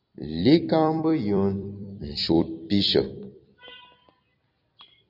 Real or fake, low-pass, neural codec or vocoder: fake; 5.4 kHz; vocoder, 44.1 kHz, 128 mel bands every 256 samples, BigVGAN v2